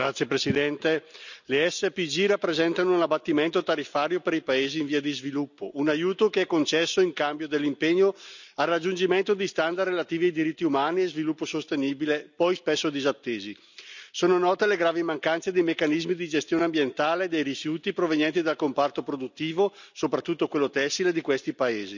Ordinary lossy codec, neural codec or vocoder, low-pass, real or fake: none; none; 7.2 kHz; real